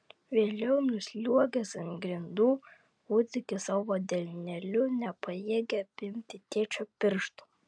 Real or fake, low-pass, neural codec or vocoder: real; 9.9 kHz; none